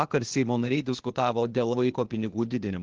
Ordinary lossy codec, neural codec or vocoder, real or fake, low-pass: Opus, 16 kbps; codec, 16 kHz, 0.8 kbps, ZipCodec; fake; 7.2 kHz